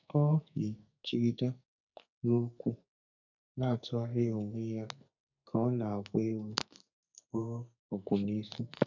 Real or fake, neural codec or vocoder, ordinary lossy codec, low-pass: fake; codec, 44.1 kHz, 2.6 kbps, SNAC; none; 7.2 kHz